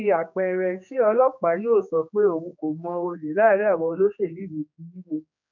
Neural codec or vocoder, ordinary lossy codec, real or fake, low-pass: codec, 16 kHz, 2 kbps, X-Codec, HuBERT features, trained on general audio; none; fake; 7.2 kHz